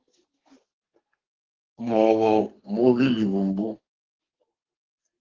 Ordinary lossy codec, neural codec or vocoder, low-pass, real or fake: Opus, 16 kbps; codec, 32 kHz, 1.9 kbps, SNAC; 7.2 kHz; fake